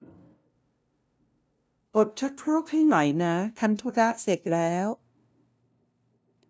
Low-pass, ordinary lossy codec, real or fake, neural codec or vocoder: none; none; fake; codec, 16 kHz, 0.5 kbps, FunCodec, trained on LibriTTS, 25 frames a second